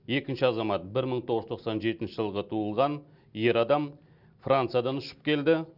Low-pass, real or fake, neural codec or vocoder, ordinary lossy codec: 5.4 kHz; real; none; none